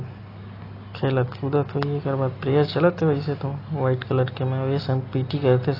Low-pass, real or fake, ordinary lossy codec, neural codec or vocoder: 5.4 kHz; real; AAC, 24 kbps; none